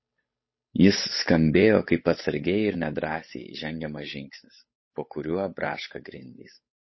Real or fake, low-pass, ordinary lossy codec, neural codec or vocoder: fake; 7.2 kHz; MP3, 24 kbps; codec, 16 kHz, 8 kbps, FunCodec, trained on Chinese and English, 25 frames a second